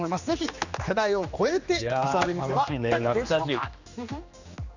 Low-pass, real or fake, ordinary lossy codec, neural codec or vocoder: 7.2 kHz; fake; none; codec, 16 kHz, 2 kbps, X-Codec, HuBERT features, trained on general audio